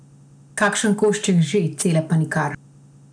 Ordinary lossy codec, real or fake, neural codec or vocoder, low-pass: none; real; none; 9.9 kHz